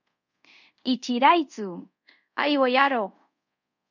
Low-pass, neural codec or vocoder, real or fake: 7.2 kHz; codec, 24 kHz, 0.5 kbps, DualCodec; fake